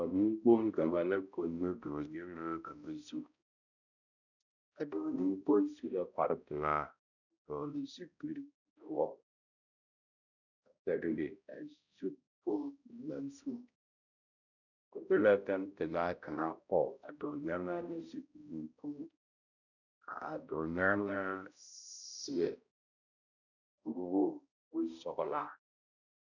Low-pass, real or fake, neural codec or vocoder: 7.2 kHz; fake; codec, 16 kHz, 0.5 kbps, X-Codec, HuBERT features, trained on balanced general audio